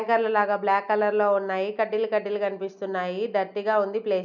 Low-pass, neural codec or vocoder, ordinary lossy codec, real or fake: 7.2 kHz; none; none; real